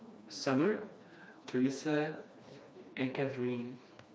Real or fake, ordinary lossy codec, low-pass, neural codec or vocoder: fake; none; none; codec, 16 kHz, 2 kbps, FreqCodec, smaller model